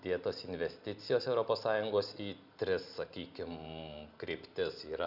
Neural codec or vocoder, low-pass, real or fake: none; 5.4 kHz; real